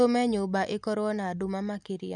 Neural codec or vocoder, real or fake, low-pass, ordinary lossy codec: none; real; 10.8 kHz; none